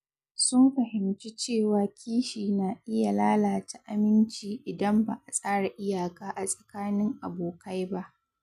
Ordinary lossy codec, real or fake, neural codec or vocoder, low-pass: none; real; none; 14.4 kHz